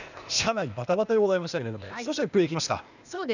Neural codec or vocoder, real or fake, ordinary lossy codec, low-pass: codec, 16 kHz, 0.8 kbps, ZipCodec; fake; none; 7.2 kHz